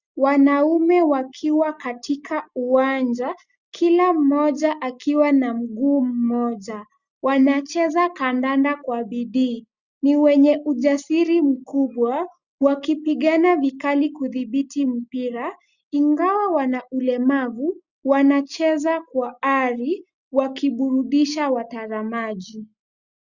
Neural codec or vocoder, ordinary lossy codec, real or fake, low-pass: none; Opus, 64 kbps; real; 7.2 kHz